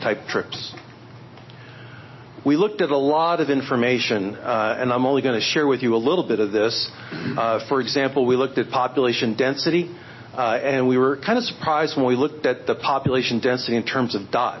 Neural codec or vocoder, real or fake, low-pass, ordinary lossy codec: none; real; 7.2 kHz; MP3, 24 kbps